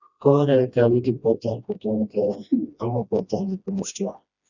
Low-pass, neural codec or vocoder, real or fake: 7.2 kHz; codec, 16 kHz, 1 kbps, FreqCodec, smaller model; fake